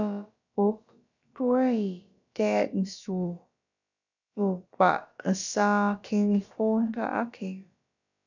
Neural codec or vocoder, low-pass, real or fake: codec, 16 kHz, about 1 kbps, DyCAST, with the encoder's durations; 7.2 kHz; fake